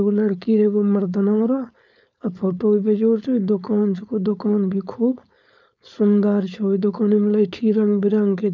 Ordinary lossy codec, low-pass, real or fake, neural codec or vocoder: none; 7.2 kHz; fake; codec, 16 kHz, 4.8 kbps, FACodec